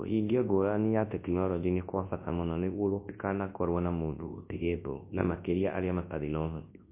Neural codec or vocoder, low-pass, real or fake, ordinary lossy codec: codec, 24 kHz, 0.9 kbps, WavTokenizer, large speech release; 3.6 kHz; fake; MP3, 24 kbps